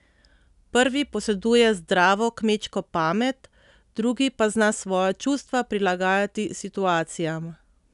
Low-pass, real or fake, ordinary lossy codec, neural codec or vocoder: 10.8 kHz; real; none; none